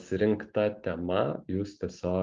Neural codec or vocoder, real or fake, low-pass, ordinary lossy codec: none; real; 7.2 kHz; Opus, 32 kbps